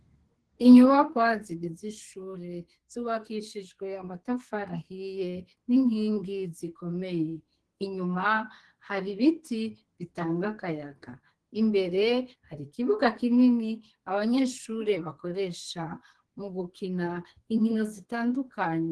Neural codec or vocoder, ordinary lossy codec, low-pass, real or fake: codec, 32 kHz, 1.9 kbps, SNAC; Opus, 16 kbps; 10.8 kHz; fake